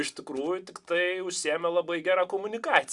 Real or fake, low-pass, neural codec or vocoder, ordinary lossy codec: real; 10.8 kHz; none; Opus, 64 kbps